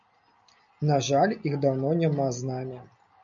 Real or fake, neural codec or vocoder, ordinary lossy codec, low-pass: real; none; AAC, 64 kbps; 7.2 kHz